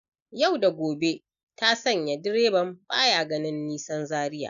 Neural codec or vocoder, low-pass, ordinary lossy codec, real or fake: none; 7.2 kHz; none; real